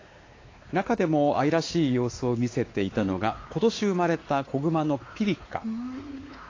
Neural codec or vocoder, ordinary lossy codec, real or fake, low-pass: codec, 16 kHz, 4 kbps, X-Codec, WavLM features, trained on Multilingual LibriSpeech; AAC, 32 kbps; fake; 7.2 kHz